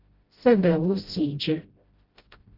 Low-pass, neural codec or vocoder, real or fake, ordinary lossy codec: 5.4 kHz; codec, 16 kHz, 0.5 kbps, FreqCodec, smaller model; fake; Opus, 24 kbps